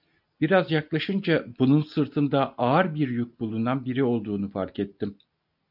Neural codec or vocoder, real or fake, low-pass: none; real; 5.4 kHz